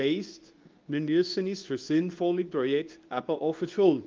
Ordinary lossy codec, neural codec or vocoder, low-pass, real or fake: Opus, 32 kbps; codec, 24 kHz, 0.9 kbps, WavTokenizer, medium speech release version 2; 7.2 kHz; fake